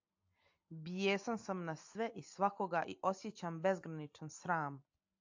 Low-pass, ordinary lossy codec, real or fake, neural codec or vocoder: 7.2 kHz; AAC, 48 kbps; real; none